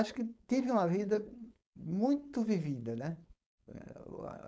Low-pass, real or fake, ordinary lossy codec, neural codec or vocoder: none; fake; none; codec, 16 kHz, 4.8 kbps, FACodec